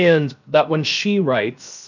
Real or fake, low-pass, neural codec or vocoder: fake; 7.2 kHz; codec, 16 kHz, 0.7 kbps, FocalCodec